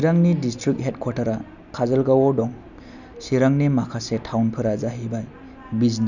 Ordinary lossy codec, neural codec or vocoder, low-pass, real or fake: none; none; 7.2 kHz; real